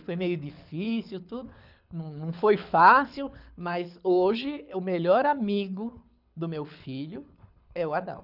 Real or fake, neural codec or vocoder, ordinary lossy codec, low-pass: fake; codec, 24 kHz, 6 kbps, HILCodec; none; 5.4 kHz